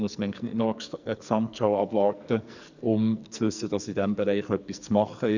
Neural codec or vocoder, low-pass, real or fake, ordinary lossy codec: codec, 44.1 kHz, 2.6 kbps, SNAC; 7.2 kHz; fake; none